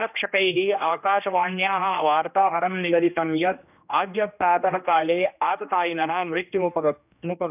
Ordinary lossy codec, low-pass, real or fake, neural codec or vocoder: none; 3.6 kHz; fake; codec, 16 kHz, 1 kbps, X-Codec, HuBERT features, trained on general audio